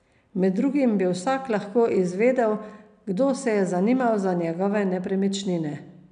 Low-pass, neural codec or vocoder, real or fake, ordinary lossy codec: 9.9 kHz; none; real; none